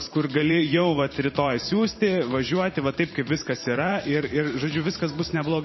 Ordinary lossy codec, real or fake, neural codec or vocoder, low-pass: MP3, 24 kbps; fake; vocoder, 44.1 kHz, 128 mel bands every 512 samples, BigVGAN v2; 7.2 kHz